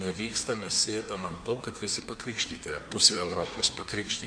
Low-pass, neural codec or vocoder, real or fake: 9.9 kHz; codec, 24 kHz, 1 kbps, SNAC; fake